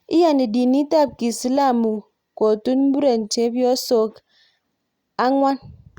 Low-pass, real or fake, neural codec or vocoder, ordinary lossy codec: 19.8 kHz; real; none; Opus, 64 kbps